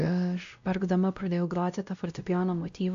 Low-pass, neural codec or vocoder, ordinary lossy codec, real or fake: 7.2 kHz; codec, 16 kHz, 0.5 kbps, X-Codec, WavLM features, trained on Multilingual LibriSpeech; AAC, 64 kbps; fake